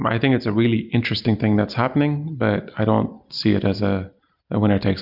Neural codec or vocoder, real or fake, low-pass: none; real; 5.4 kHz